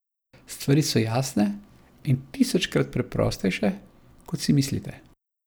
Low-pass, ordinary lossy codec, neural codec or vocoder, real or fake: none; none; none; real